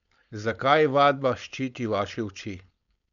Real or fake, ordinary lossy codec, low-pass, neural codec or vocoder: fake; none; 7.2 kHz; codec, 16 kHz, 4.8 kbps, FACodec